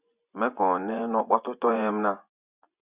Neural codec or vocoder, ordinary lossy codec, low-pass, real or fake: vocoder, 24 kHz, 100 mel bands, Vocos; Opus, 64 kbps; 3.6 kHz; fake